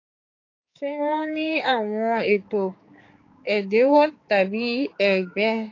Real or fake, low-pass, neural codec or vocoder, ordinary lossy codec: fake; 7.2 kHz; codec, 16 kHz, 4 kbps, X-Codec, HuBERT features, trained on balanced general audio; AAC, 32 kbps